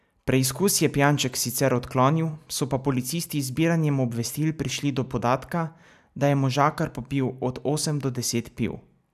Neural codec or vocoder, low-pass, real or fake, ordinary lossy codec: none; 14.4 kHz; real; none